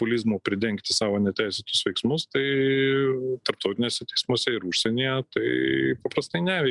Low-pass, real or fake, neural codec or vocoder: 10.8 kHz; real; none